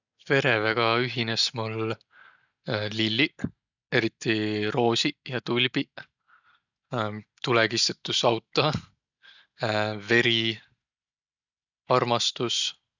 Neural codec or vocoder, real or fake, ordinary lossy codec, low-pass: none; real; none; 7.2 kHz